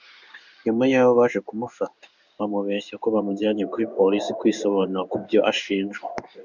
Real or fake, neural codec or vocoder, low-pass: fake; codec, 16 kHz in and 24 kHz out, 1 kbps, XY-Tokenizer; 7.2 kHz